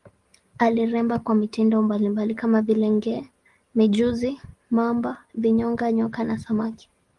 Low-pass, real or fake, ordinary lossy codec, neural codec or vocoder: 10.8 kHz; real; Opus, 24 kbps; none